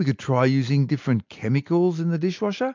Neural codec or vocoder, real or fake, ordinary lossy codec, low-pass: none; real; MP3, 64 kbps; 7.2 kHz